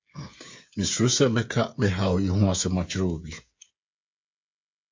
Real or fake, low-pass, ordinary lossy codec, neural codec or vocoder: fake; 7.2 kHz; MP3, 48 kbps; codec, 16 kHz, 8 kbps, FreqCodec, smaller model